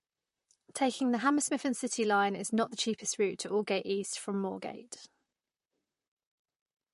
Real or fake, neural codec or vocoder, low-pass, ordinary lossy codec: fake; vocoder, 44.1 kHz, 128 mel bands, Pupu-Vocoder; 14.4 kHz; MP3, 48 kbps